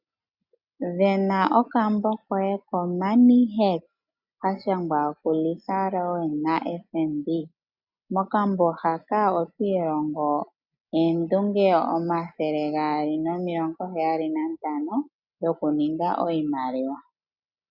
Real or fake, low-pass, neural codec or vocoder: real; 5.4 kHz; none